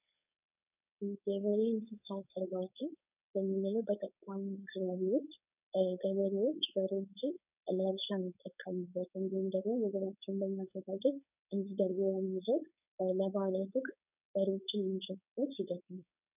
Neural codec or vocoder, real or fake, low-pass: codec, 16 kHz, 4.8 kbps, FACodec; fake; 3.6 kHz